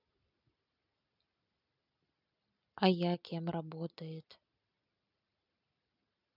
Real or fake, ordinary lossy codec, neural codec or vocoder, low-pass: real; none; none; 5.4 kHz